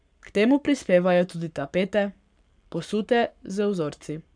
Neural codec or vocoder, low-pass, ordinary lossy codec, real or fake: codec, 44.1 kHz, 7.8 kbps, Pupu-Codec; 9.9 kHz; none; fake